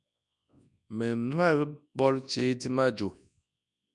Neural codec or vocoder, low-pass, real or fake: codec, 24 kHz, 0.9 kbps, WavTokenizer, large speech release; 10.8 kHz; fake